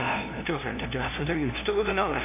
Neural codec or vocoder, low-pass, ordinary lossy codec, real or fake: codec, 16 kHz, 0.5 kbps, FunCodec, trained on LibriTTS, 25 frames a second; 3.6 kHz; AAC, 32 kbps; fake